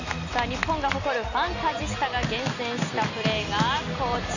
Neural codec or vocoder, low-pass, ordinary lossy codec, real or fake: none; 7.2 kHz; none; real